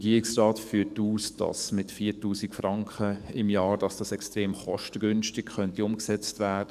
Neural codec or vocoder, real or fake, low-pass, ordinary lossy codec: codec, 44.1 kHz, 7.8 kbps, DAC; fake; 14.4 kHz; none